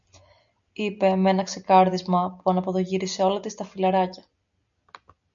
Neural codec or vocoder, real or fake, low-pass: none; real; 7.2 kHz